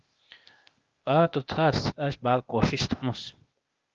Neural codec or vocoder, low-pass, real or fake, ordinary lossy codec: codec, 16 kHz, 0.8 kbps, ZipCodec; 7.2 kHz; fake; Opus, 24 kbps